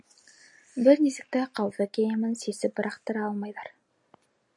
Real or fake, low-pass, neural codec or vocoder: real; 10.8 kHz; none